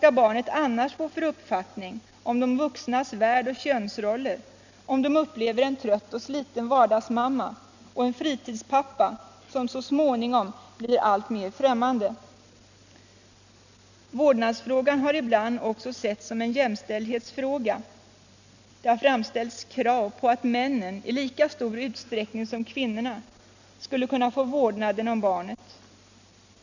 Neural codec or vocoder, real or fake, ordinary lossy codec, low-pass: none; real; none; 7.2 kHz